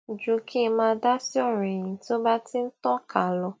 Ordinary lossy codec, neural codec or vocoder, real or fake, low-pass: none; none; real; none